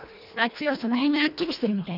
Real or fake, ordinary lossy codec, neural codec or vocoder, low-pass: fake; none; codec, 24 kHz, 1.5 kbps, HILCodec; 5.4 kHz